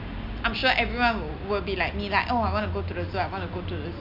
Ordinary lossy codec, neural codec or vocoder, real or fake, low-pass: MP3, 48 kbps; none; real; 5.4 kHz